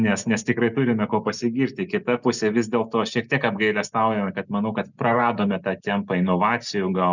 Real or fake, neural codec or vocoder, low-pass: real; none; 7.2 kHz